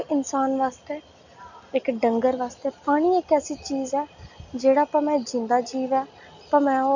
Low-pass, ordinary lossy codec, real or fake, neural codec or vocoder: 7.2 kHz; none; real; none